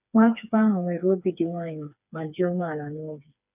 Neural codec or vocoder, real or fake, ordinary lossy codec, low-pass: codec, 16 kHz, 4 kbps, FreqCodec, smaller model; fake; none; 3.6 kHz